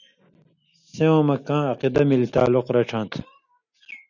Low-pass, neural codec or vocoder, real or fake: 7.2 kHz; none; real